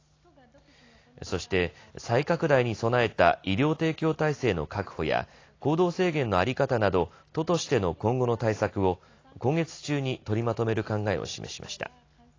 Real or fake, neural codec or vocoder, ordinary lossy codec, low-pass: real; none; AAC, 32 kbps; 7.2 kHz